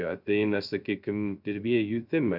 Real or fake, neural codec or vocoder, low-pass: fake; codec, 16 kHz, 0.2 kbps, FocalCodec; 5.4 kHz